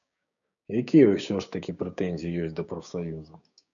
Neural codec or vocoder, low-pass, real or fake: codec, 16 kHz, 6 kbps, DAC; 7.2 kHz; fake